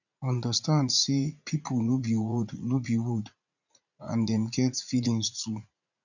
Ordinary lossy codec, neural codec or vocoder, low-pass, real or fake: none; vocoder, 44.1 kHz, 80 mel bands, Vocos; 7.2 kHz; fake